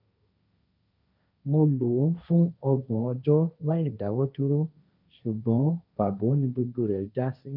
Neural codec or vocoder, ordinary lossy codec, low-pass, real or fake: codec, 16 kHz, 1.1 kbps, Voila-Tokenizer; none; 5.4 kHz; fake